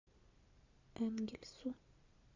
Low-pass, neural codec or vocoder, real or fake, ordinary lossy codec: 7.2 kHz; none; real; AAC, 48 kbps